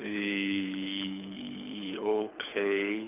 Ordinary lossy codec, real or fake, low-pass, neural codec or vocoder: none; fake; 3.6 kHz; codec, 24 kHz, 6 kbps, HILCodec